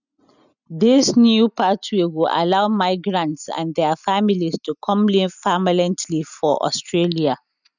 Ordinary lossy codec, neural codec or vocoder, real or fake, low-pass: none; none; real; 7.2 kHz